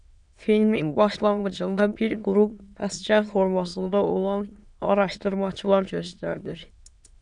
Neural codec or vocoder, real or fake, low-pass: autoencoder, 22.05 kHz, a latent of 192 numbers a frame, VITS, trained on many speakers; fake; 9.9 kHz